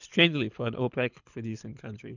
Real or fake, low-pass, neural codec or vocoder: fake; 7.2 kHz; codec, 24 kHz, 6 kbps, HILCodec